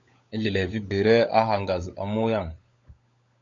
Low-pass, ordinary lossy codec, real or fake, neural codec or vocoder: 7.2 kHz; AAC, 48 kbps; fake; codec, 16 kHz, 16 kbps, FunCodec, trained on LibriTTS, 50 frames a second